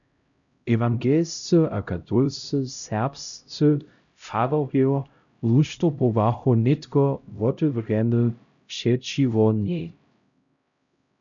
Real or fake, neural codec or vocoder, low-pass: fake; codec, 16 kHz, 0.5 kbps, X-Codec, HuBERT features, trained on LibriSpeech; 7.2 kHz